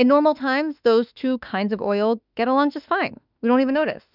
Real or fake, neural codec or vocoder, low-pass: fake; autoencoder, 48 kHz, 32 numbers a frame, DAC-VAE, trained on Japanese speech; 5.4 kHz